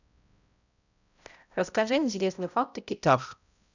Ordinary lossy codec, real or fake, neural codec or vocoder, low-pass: none; fake; codec, 16 kHz, 0.5 kbps, X-Codec, HuBERT features, trained on balanced general audio; 7.2 kHz